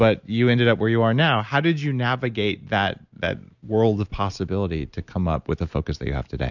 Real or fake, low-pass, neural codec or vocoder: real; 7.2 kHz; none